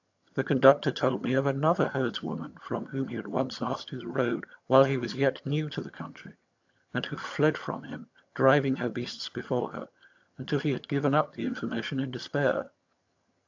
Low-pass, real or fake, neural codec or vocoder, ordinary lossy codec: 7.2 kHz; fake; vocoder, 22.05 kHz, 80 mel bands, HiFi-GAN; AAC, 48 kbps